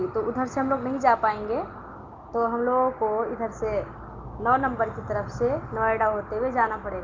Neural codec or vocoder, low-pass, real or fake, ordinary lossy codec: none; 7.2 kHz; real; Opus, 32 kbps